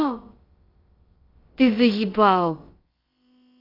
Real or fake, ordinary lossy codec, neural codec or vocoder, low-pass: fake; Opus, 32 kbps; codec, 16 kHz, about 1 kbps, DyCAST, with the encoder's durations; 5.4 kHz